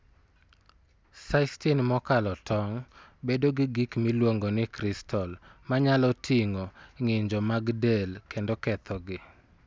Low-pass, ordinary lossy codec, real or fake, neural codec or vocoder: none; none; real; none